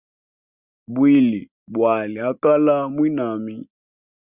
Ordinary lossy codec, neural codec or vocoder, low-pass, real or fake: Opus, 64 kbps; none; 3.6 kHz; real